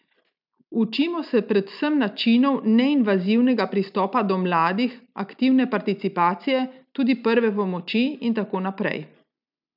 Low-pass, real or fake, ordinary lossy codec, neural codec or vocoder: 5.4 kHz; real; none; none